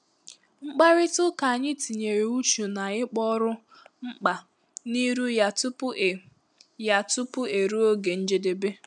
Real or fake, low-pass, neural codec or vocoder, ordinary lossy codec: real; 10.8 kHz; none; none